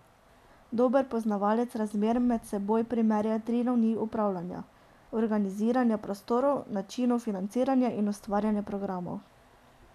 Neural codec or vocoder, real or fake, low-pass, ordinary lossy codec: none; real; 14.4 kHz; none